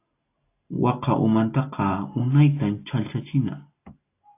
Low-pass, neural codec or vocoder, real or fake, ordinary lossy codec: 3.6 kHz; none; real; AAC, 24 kbps